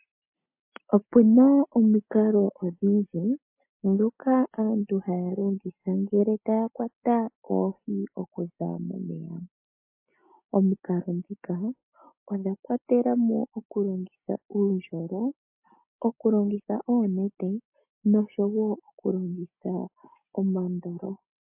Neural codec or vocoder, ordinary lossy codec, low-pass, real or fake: none; MP3, 24 kbps; 3.6 kHz; real